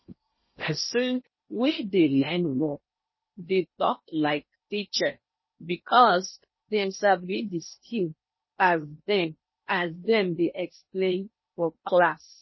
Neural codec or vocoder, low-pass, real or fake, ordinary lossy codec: codec, 16 kHz in and 24 kHz out, 0.8 kbps, FocalCodec, streaming, 65536 codes; 7.2 kHz; fake; MP3, 24 kbps